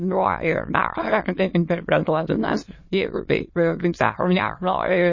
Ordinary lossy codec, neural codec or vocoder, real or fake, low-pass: MP3, 32 kbps; autoencoder, 22.05 kHz, a latent of 192 numbers a frame, VITS, trained on many speakers; fake; 7.2 kHz